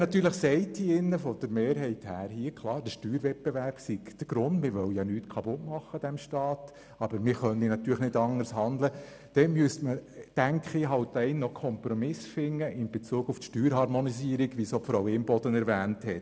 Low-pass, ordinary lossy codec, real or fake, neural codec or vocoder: none; none; real; none